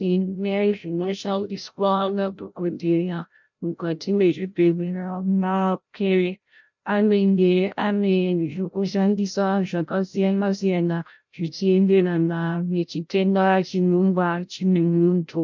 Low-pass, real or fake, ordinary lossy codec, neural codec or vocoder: 7.2 kHz; fake; MP3, 64 kbps; codec, 16 kHz, 0.5 kbps, FreqCodec, larger model